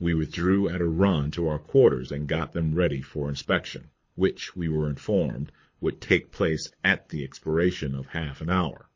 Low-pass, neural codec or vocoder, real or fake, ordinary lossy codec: 7.2 kHz; codec, 24 kHz, 6 kbps, HILCodec; fake; MP3, 32 kbps